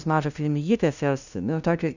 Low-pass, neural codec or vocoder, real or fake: 7.2 kHz; codec, 16 kHz, 0.5 kbps, FunCodec, trained on LibriTTS, 25 frames a second; fake